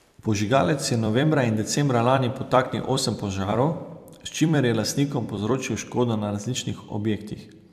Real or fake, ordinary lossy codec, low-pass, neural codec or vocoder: fake; AAC, 96 kbps; 14.4 kHz; vocoder, 44.1 kHz, 128 mel bands every 256 samples, BigVGAN v2